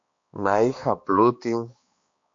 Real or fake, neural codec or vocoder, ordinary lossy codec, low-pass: fake; codec, 16 kHz, 2 kbps, X-Codec, HuBERT features, trained on balanced general audio; MP3, 48 kbps; 7.2 kHz